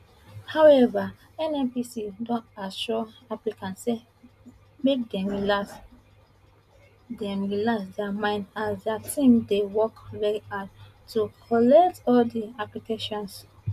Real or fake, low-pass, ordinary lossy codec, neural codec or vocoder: real; 14.4 kHz; none; none